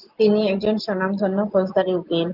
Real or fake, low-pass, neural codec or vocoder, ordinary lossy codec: real; 5.4 kHz; none; Opus, 24 kbps